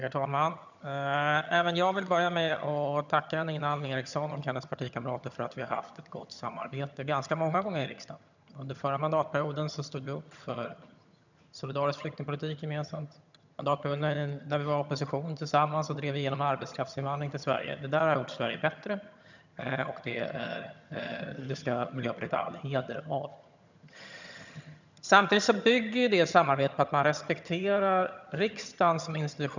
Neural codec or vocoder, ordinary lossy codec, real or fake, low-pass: vocoder, 22.05 kHz, 80 mel bands, HiFi-GAN; none; fake; 7.2 kHz